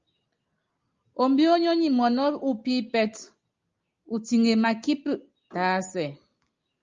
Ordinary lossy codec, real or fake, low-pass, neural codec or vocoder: Opus, 32 kbps; real; 7.2 kHz; none